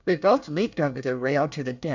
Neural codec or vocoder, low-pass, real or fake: codec, 24 kHz, 1 kbps, SNAC; 7.2 kHz; fake